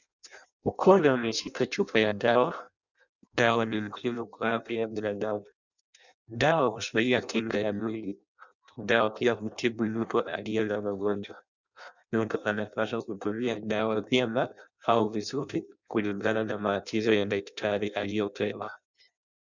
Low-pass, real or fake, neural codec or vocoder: 7.2 kHz; fake; codec, 16 kHz in and 24 kHz out, 0.6 kbps, FireRedTTS-2 codec